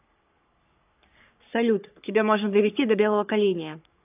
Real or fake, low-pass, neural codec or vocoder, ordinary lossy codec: fake; 3.6 kHz; codec, 44.1 kHz, 3.4 kbps, Pupu-Codec; AAC, 32 kbps